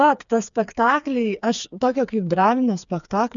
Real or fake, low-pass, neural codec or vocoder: fake; 7.2 kHz; codec, 16 kHz, 4 kbps, FreqCodec, smaller model